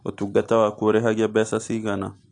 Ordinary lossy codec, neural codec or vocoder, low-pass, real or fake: AAC, 48 kbps; none; 9.9 kHz; real